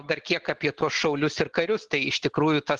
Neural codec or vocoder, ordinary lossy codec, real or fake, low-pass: none; Opus, 16 kbps; real; 10.8 kHz